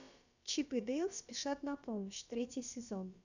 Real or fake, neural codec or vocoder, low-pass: fake; codec, 16 kHz, about 1 kbps, DyCAST, with the encoder's durations; 7.2 kHz